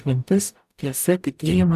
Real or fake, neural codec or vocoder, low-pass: fake; codec, 44.1 kHz, 0.9 kbps, DAC; 14.4 kHz